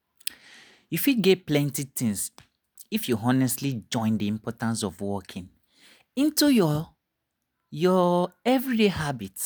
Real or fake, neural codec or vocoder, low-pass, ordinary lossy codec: real; none; none; none